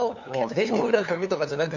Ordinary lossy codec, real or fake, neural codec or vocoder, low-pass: none; fake; codec, 16 kHz, 2 kbps, FunCodec, trained on LibriTTS, 25 frames a second; 7.2 kHz